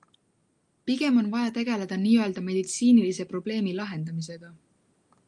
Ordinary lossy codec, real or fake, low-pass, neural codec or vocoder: Opus, 32 kbps; real; 10.8 kHz; none